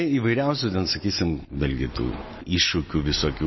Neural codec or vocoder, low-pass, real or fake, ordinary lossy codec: none; 7.2 kHz; real; MP3, 24 kbps